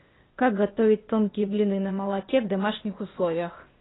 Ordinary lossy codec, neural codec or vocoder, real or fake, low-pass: AAC, 16 kbps; codec, 16 kHz, 0.8 kbps, ZipCodec; fake; 7.2 kHz